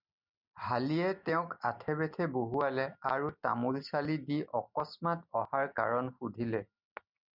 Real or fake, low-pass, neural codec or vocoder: real; 5.4 kHz; none